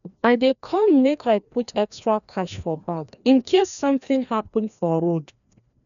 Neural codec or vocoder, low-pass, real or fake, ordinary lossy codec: codec, 16 kHz, 1 kbps, FreqCodec, larger model; 7.2 kHz; fake; none